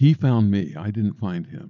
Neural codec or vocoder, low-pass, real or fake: none; 7.2 kHz; real